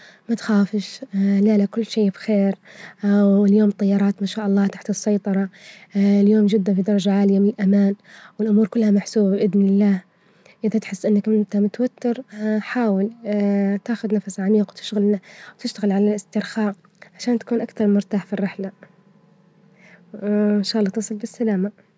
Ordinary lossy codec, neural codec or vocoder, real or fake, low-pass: none; none; real; none